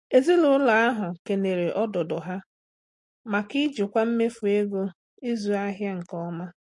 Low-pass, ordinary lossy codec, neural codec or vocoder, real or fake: 10.8 kHz; MP3, 48 kbps; none; real